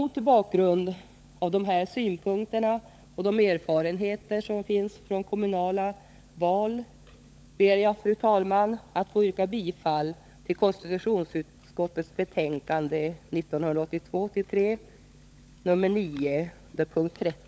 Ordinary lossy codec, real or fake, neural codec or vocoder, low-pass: none; fake; codec, 16 kHz, 16 kbps, FunCodec, trained on LibriTTS, 50 frames a second; none